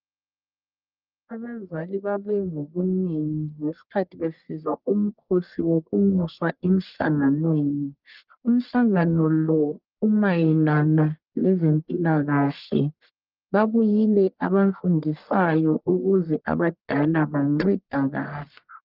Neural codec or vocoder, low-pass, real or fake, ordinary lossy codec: codec, 44.1 kHz, 1.7 kbps, Pupu-Codec; 5.4 kHz; fake; Opus, 24 kbps